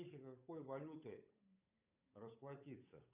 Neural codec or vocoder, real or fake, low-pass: codec, 16 kHz, 8 kbps, FunCodec, trained on Chinese and English, 25 frames a second; fake; 3.6 kHz